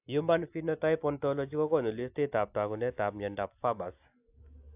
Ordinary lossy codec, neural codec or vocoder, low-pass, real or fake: AAC, 32 kbps; none; 3.6 kHz; real